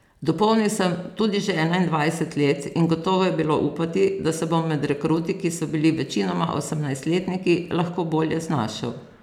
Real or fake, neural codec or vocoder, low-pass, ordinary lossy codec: real; none; 19.8 kHz; none